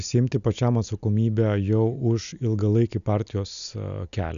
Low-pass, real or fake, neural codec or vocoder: 7.2 kHz; real; none